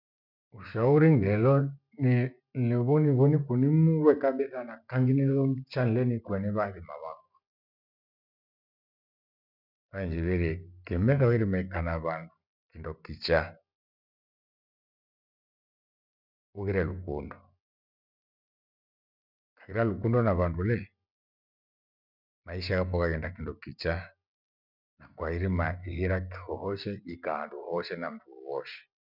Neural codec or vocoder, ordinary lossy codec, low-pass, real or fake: none; none; 5.4 kHz; real